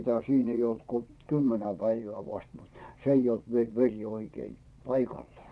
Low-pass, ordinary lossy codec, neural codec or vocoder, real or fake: none; none; vocoder, 22.05 kHz, 80 mel bands, Vocos; fake